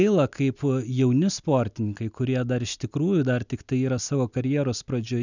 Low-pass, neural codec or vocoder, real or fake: 7.2 kHz; none; real